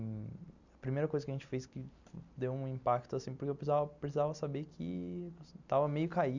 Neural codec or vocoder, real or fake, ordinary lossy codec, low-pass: none; real; none; 7.2 kHz